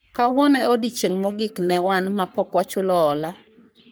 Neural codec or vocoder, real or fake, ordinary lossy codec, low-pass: codec, 44.1 kHz, 3.4 kbps, Pupu-Codec; fake; none; none